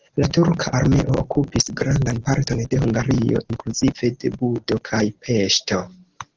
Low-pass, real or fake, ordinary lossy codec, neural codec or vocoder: 7.2 kHz; real; Opus, 16 kbps; none